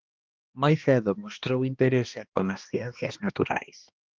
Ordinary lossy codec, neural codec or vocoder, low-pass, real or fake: Opus, 24 kbps; codec, 16 kHz, 1 kbps, X-Codec, HuBERT features, trained on balanced general audio; 7.2 kHz; fake